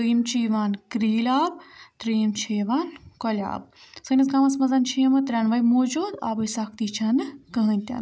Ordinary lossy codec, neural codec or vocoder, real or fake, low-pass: none; none; real; none